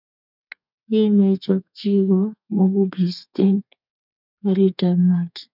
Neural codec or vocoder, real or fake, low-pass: codec, 32 kHz, 1.9 kbps, SNAC; fake; 5.4 kHz